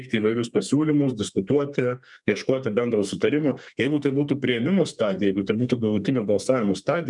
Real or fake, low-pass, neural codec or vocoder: fake; 10.8 kHz; codec, 32 kHz, 1.9 kbps, SNAC